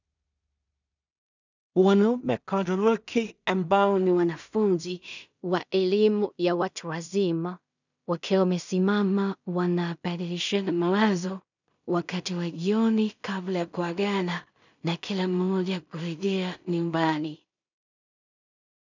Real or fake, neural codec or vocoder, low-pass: fake; codec, 16 kHz in and 24 kHz out, 0.4 kbps, LongCat-Audio-Codec, two codebook decoder; 7.2 kHz